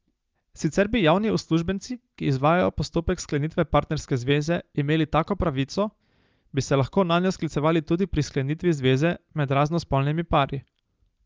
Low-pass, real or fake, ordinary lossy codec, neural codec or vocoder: 7.2 kHz; real; Opus, 24 kbps; none